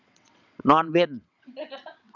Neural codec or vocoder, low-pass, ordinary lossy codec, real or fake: vocoder, 22.05 kHz, 80 mel bands, WaveNeXt; 7.2 kHz; none; fake